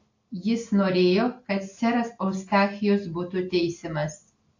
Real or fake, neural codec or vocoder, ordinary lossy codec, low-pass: real; none; AAC, 48 kbps; 7.2 kHz